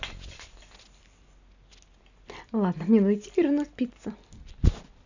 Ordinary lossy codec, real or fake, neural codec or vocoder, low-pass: none; real; none; 7.2 kHz